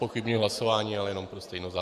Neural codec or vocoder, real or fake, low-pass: vocoder, 48 kHz, 128 mel bands, Vocos; fake; 14.4 kHz